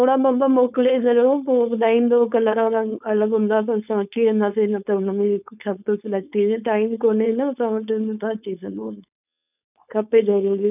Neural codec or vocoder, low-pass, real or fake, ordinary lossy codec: codec, 16 kHz, 4.8 kbps, FACodec; 3.6 kHz; fake; none